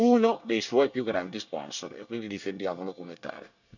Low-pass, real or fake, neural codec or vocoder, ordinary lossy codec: 7.2 kHz; fake; codec, 24 kHz, 1 kbps, SNAC; none